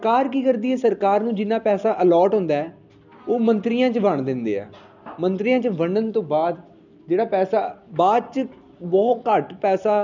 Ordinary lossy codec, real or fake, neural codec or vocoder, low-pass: none; real; none; 7.2 kHz